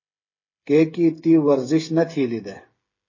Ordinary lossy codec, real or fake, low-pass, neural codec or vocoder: MP3, 32 kbps; fake; 7.2 kHz; codec, 16 kHz, 8 kbps, FreqCodec, smaller model